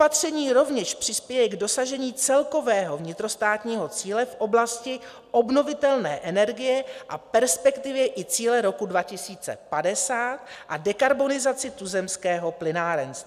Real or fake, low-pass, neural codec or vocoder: real; 14.4 kHz; none